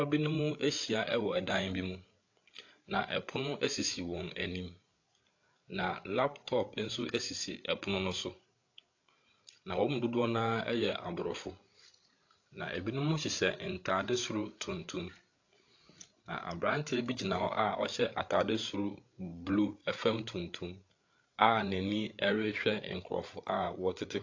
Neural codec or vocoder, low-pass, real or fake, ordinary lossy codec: codec, 16 kHz, 8 kbps, FreqCodec, larger model; 7.2 kHz; fake; AAC, 48 kbps